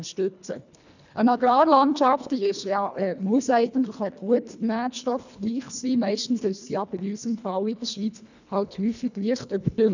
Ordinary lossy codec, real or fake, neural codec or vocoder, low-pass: none; fake; codec, 24 kHz, 1.5 kbps, HILCodec; 7.2 kHz